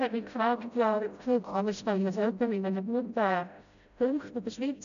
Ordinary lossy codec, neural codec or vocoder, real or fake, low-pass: none; codec, 16 kHz, 0.5 kbps, FreqCodec, smaller model; fake; 7.2 kHz